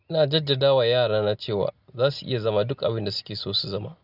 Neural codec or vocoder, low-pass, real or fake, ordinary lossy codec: none; 5.4 kHz; real; none